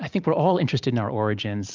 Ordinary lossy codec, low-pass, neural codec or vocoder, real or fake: Opus, 24 kbps; 7.2 kHz; none; real